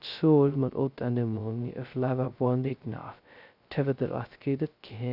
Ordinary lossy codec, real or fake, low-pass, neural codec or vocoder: none; fake; 5.4 kHz; codec, 16 kHz, 0.2 kbps, FocalCodec